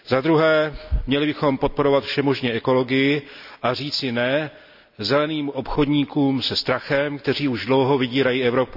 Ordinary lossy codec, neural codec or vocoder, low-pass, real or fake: none; none; 5.4 kHz; real